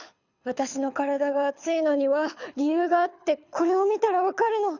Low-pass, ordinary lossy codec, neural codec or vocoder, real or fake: 7.2 kHz; none; codec, 24 kHz, 6 kbps, HILCodec; fake